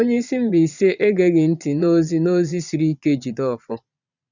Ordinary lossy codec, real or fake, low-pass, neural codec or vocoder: none; fake; 7.2 kHz; vocoder, 22.05 kHz, 80 mel bands, Vocos